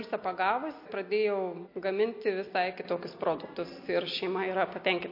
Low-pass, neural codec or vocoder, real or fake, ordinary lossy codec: 5.4 kHz; none; real; MP3, 32 kbps